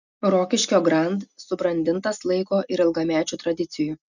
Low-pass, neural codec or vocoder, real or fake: 7.2 kHz; none; real